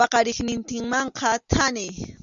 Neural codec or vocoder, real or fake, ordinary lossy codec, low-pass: none; real; Opus, 64 kbps; 7.2 kHz